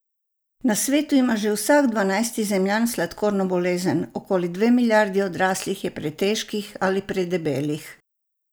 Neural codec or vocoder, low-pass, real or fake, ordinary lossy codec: none; none; real; none